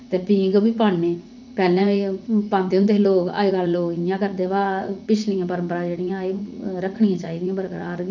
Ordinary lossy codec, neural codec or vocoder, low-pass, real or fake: none; vocoder, 44.1 kHz, 80 mel bands, Vocos; 7.2 kHz; fake